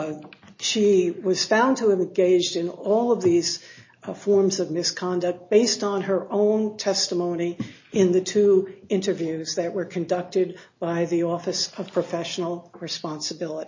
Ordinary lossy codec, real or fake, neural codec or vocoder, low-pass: MP3, 32 kbps; real; none; 7.2 kHz